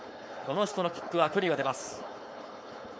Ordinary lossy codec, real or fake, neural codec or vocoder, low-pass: none; fake; codec, 16 kHz, 4.8 kbps, FACodec; none